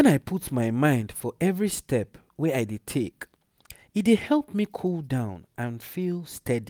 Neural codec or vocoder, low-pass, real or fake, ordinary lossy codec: none; none; real; none